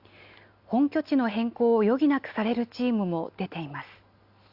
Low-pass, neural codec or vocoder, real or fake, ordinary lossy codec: 5.4 kHz; none; real; Opus, 64 kbps